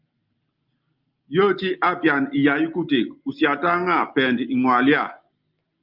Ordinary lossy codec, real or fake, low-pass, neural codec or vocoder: Opus, 24 kbps; real; 5.4 kHz; none